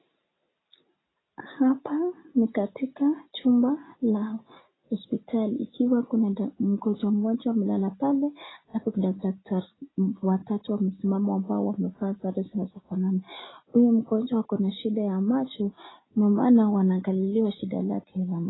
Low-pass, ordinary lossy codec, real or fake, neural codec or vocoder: 7.2 kHz; AAC, 16 kbps; real; none